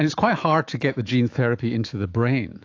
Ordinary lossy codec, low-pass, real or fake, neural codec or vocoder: AAC, 48 kbps; 7.2 kHz; fake; autoencoder, 48 kHz, 128 numbers a frame, DAC-VAE, trained on Japanese speech